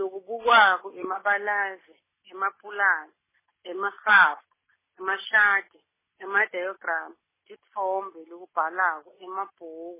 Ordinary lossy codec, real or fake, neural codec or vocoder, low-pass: MP3, 16 kbps; real; none; 3.6 kHz